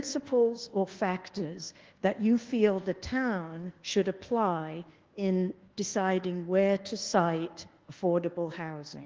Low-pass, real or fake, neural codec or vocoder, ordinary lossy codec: 7.2 kHz; fake; codec, 24 kHz, 1.2 kbps, DualCodec; Opus, 16 kbps